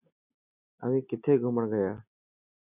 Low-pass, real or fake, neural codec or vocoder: 3.6 kHz; real; none